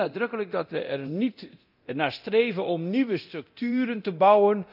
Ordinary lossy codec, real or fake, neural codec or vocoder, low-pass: none; fake; codec, 24 kHz, 0.9 kbps, DualCodec; 5.4 kHz